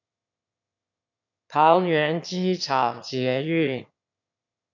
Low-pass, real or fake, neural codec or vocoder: 7.2 kHz; fake; autoencoder, 22.05 kHz, a latent of 192 numbers a frame, VITS, trained on one speaker